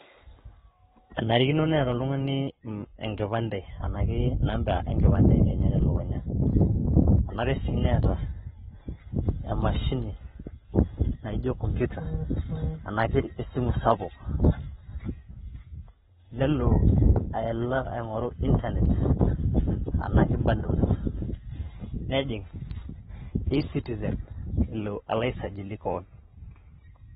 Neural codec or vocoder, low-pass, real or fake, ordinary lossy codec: codec, 44.1 kHz, 7.8 kbps, Pupu-Codec; 19.8 kHz; fake; AAC, 16 kbps